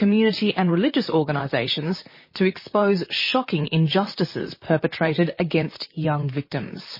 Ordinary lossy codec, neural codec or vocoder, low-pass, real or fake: MP3, 24 kbps; vocoder, 44.1 kHz, 128 mel bands, Pupu-Vocoder; 5.4 kHz; fake